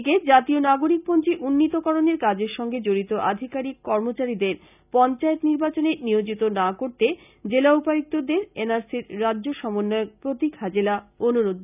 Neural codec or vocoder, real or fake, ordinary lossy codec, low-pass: none; real; none; 3.6 kHz